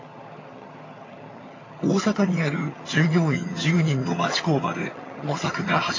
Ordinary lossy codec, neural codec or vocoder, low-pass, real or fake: AAC, 32 kbps; vocoder, 22.05 kHz, 80 mel bands, HiFi-GAN; 7.2 kHz; fake